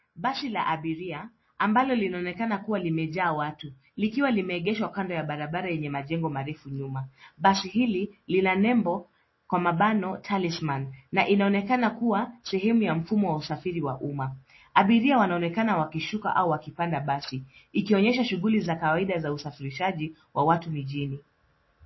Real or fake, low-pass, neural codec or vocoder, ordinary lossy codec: real; 7.2 kHz; none; MP3, 24 kbps